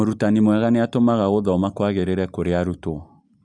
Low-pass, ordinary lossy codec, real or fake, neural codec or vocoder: 9.9 kHz; none; real; none